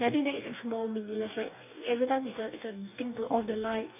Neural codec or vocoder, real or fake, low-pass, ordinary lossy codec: codec, 44.1 kHz, 2.6 kbps, DAC; fake; 3.6 kHz; none